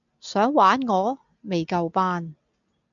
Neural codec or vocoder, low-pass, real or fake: none; 7.2 kHz; real